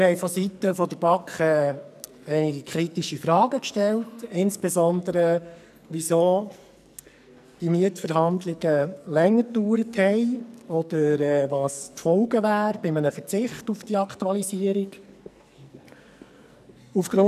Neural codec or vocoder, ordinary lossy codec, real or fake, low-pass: codec, 44.1 kHz, 2.6 kbps, SNAC; none; fake; 14.4 kHz